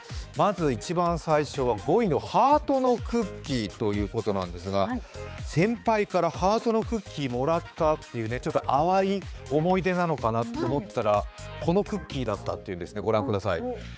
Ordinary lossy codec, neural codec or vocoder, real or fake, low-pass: none; codec, 16 kHz, 4 kbps, X-Codec, HuBERT features, trained on balanced general audio; fake; none